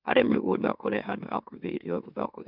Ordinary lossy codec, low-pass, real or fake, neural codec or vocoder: none; 5.4 kHz; fake; autoencoder, 44.1 kHz, a latent of 192 numbers a frame, MeloTTS